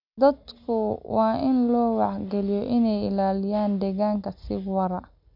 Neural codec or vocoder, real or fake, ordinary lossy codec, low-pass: none; real; none; 5.4 kHz